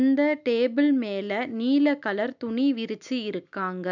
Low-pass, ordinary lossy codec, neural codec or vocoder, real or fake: 7.2 kHz; none; none; real